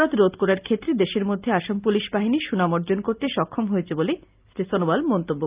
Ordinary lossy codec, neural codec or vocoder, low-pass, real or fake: Opus, 24 kbps; none; 3.6 kHz; real